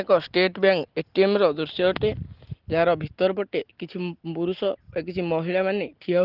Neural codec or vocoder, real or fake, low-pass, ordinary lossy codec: none; real; 5.4 kHz; Opus, 16 kbps